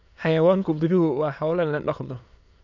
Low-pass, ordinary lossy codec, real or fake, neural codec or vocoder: 7.2 kHz; none; fake; autoencoder, 22.05 kHz, a latent of 192 numbers a frame, VITS, trained on many speakers